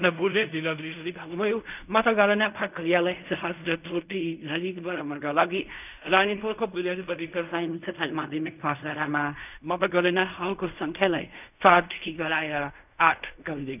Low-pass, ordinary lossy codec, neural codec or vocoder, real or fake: 3.6 kHz; none; codec, 16 kHz in and 24 kHz out, 0.4 kbps, LongCat-Audio-Codec, fine tuned four codebook decoder; fake